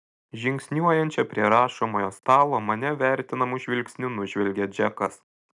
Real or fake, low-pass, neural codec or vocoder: real; 10.8 kHz; none